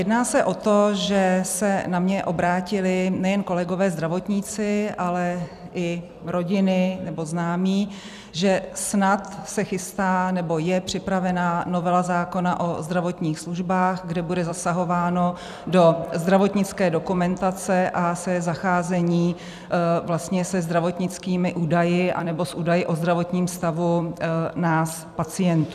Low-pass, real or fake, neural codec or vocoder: 14.4 kHz; fake; vocoder, 44.1 kHz, 128 mel bands every 256 samples, BigVGAN v2